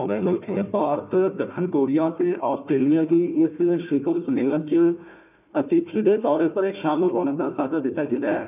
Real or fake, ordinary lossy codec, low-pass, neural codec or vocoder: fake; none; 3.6 kHz; codec, 16 kHz, 1 kbps, FunCodec, trained on Chinese and English, 50 frames a second